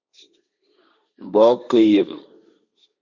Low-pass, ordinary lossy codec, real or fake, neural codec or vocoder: 7.2 kHz; Opus, 64 kbps; fake; codec, 16 kHz, 1.1 kbps, Voila-Tokenizer